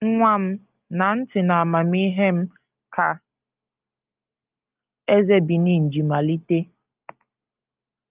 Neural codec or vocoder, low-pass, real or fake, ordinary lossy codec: none; 3.6 kHz; real; Opus, 24 kbps